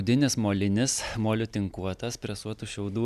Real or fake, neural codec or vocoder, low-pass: real; none; 14.4 kHz